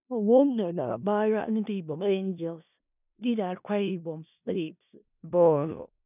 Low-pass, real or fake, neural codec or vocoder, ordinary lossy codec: 3.6 kHz; fake; codec, 16 kHz in and 24 kHz out, 0.4 kbps, LongCat-Audio-Codec, four codebook decoder; none